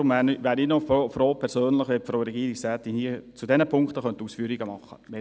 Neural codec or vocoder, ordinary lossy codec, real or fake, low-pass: none; none; real; none